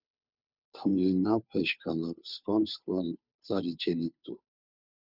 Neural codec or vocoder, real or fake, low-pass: codec, 16 kHz, 2 kbps, FunCodec, trained on Chinese and English, 25 frames a second; fake; 5.4 kHz